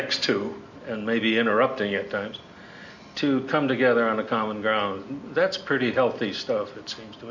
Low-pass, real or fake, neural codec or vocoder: 7.2 kHz; real; none